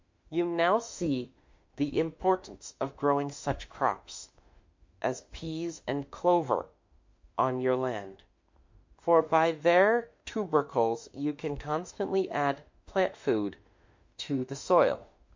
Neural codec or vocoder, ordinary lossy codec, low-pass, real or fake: autoencoder, 48 kHz, 32 numbers a frame, DAC-VAE, trained on Japanese speech; MP3, 48 kbps; 7.2 kHz; fake